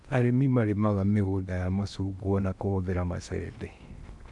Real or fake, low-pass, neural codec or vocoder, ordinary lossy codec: fake; 10.8 kHz; codec, 16 kHz in and 24 kHz out, 0.8 kbps, FocalCodec, streaming, 65536 codes; none